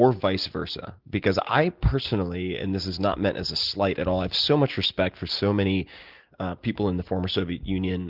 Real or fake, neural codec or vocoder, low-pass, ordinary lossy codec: real; none; 5.4 kHz; Opus, 32 kbps